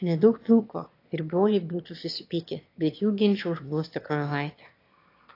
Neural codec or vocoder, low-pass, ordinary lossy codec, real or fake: autoencoder, 22.05 kHz, a latent of 192 numbers a frame, VITS, trained on one speaker; 5.4 kHz; AAC, 32 kbps; fake